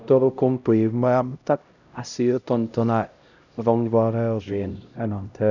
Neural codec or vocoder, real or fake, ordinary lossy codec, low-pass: codec, 16 kHz, 0.5 kbps, X-Codec, HuBERT features, trained on LibriSpeech; fake; none; 7.2 kHz